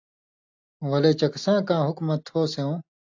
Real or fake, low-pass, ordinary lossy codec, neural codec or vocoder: real; 7.2 kHz; AAC, 48 kbps; none